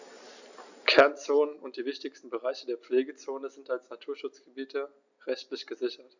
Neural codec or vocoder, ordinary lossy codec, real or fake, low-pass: none; none; real; 7.2 kHz